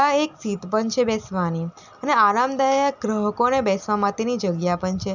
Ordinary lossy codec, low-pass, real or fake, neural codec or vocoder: none; 7.2 kHz; real; none